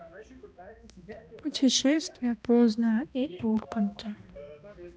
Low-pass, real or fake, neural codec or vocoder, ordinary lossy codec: none; fake; codec, 16 kHz, 1 kbps, X-Codec, HuBERT features, trained on balanced general audio; none